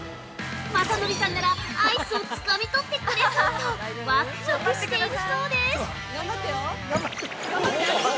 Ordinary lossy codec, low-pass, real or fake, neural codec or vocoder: none; none; real; none